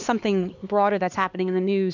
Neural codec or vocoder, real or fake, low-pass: codec, 16 kHz, 2 kbps, X-Codec, HuBERT features, trained on LibriSpeech; fake; 7.2 kHz